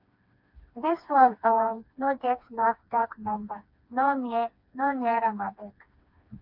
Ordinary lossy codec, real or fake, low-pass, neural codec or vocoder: MP3, 48 kbps; fake; 5.4 kHz; codec, 16 kHz, 2 kbps, FreqCodec, smaller model